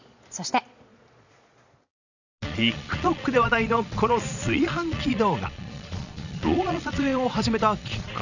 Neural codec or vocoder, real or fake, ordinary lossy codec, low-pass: vocoder, 22.05 kHz, 80 mel bands, Vocos; fake; none; 7.2 kHz